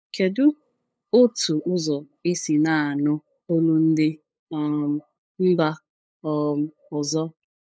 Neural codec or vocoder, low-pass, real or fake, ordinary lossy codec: codec, 16 kHz, 8 kbps, FunCodec, trained on LibriTTS, 25 frames a second; none; fake; none